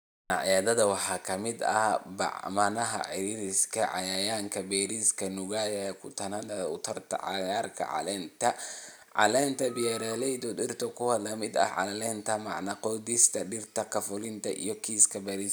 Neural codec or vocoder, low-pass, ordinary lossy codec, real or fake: none; none; none; real